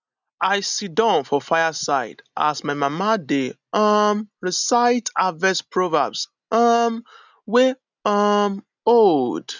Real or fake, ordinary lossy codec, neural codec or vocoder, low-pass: real; none; none; 7.2 kHz